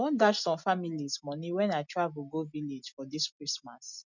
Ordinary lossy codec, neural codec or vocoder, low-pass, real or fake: none; none; 7.2 kHz; real